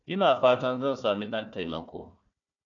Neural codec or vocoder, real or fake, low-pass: codec, 16 kHz, 1 kbps, FunCodec, trained on Chinese and English, 50 frames a second; fake; 7.2 kHz